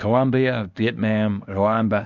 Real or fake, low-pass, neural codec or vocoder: fake; 7.2 kHz; codec, 24 kHz, 0.9 kbps, WavTokenizer, medium speech release version 1